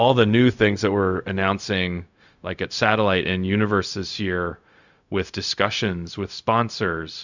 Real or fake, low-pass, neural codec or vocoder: fake; 7.2 kHz; codec, 16 kHz, 0.4 kbps, LongCat-Audio-Codec